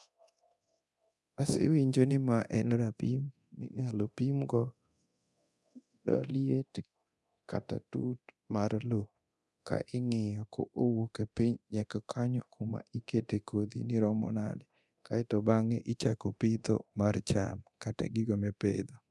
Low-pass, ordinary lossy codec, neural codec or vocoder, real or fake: none; none; codec, 24 kHz, 0.9 kbps, DualCodec; fake